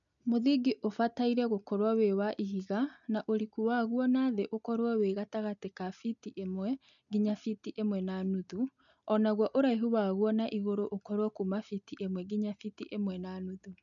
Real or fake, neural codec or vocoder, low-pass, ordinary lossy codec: real; none; 7.2 kHz; none